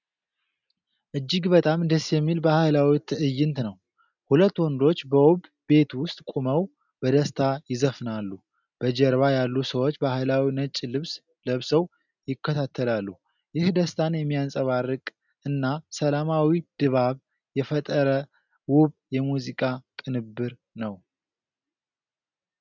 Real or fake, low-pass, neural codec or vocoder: real; 7.2 kHz; none